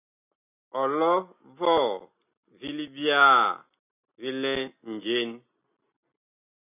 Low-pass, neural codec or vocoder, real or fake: 3.6 kHz; none; real